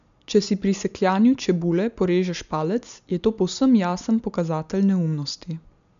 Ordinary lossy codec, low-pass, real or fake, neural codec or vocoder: none; 7.2 kHz; real; none